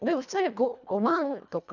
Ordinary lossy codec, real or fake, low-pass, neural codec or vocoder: none; fake; 7.2 kHz; codec, 24 kHz, 1.5 kbps, HILCodec